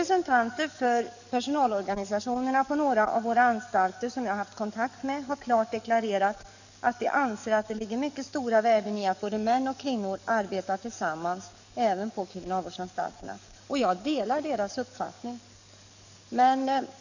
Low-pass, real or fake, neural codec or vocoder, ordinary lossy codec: 7.2 kHz; fake; codec, 44.1 kHz, 7.8 kbps, DAC; none